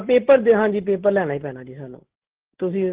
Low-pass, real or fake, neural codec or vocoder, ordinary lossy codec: 3.6 kHz; real; none; Opus, 16 kbps